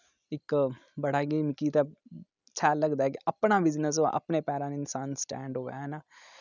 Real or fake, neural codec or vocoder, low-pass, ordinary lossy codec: real; none; 7.2 kHz; none